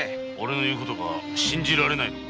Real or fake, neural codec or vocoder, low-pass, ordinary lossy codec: real; none; none; none